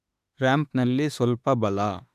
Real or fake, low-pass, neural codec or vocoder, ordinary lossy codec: fake; 14.4 kHz; autoencoder, 48 kHz, 32 numbers a frame, DAC-VAE, trained on Japanese speech; AAC, 96 kbps